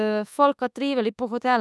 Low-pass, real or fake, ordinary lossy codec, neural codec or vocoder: none; fake; none; codec, 24 kHz, 1.2 kbps, DualCodec